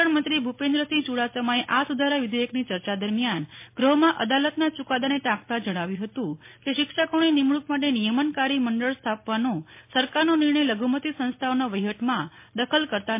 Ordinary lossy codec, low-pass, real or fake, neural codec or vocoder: MP3, 24 kbps; 3.6 kHz; real; none